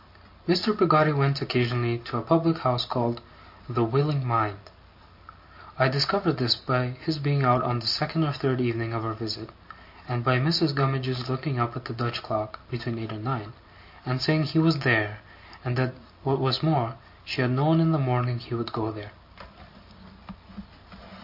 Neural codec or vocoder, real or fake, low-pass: none; real; 5.4 kHz